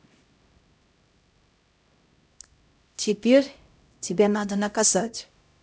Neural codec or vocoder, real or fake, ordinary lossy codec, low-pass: codec, 16 kHz, 0.5 kbps, X-Codec, HuBERT features, trained on LibriSpeech; fake; none; none